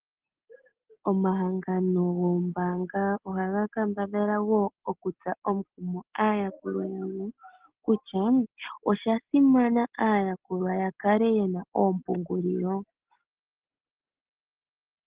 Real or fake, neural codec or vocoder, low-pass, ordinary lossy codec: real; none; 3.6 kHz; Opus, 16 kbps